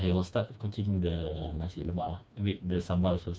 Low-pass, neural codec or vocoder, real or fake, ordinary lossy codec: none; codec, 16 kHz, 2 kbps, FreqCodec, smaller model; fake; none